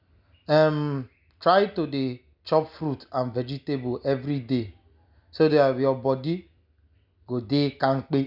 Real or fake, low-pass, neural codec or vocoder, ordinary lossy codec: real; 5.4 kHz; none; none